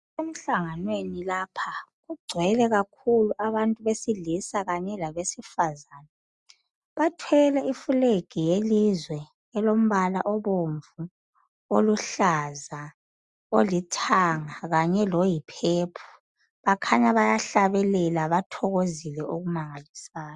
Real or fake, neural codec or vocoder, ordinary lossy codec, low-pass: real; none; Opus, 64 kbps; 10.8 kHz